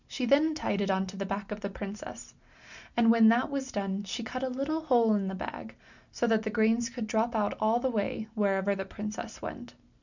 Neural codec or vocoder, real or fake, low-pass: none; real; 7.2 kHz